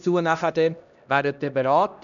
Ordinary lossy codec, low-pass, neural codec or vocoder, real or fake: none; 7.2 kHz; codec, 16 kHz, 1 kbps, X-Codec, HuBERT features, trained on LibriSpeech; fake